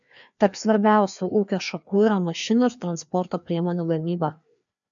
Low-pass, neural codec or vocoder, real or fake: 7.2 kHz; codec, 16 kHz, 2 kbps, FreqCodec, larger model; fake